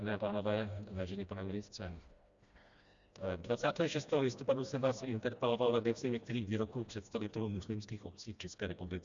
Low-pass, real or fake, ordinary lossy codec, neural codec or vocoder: 7.2 kHz; fake; Opus, 64 kbps; codec, 16 kHz, 1 kbps, FreqCodec, smaller model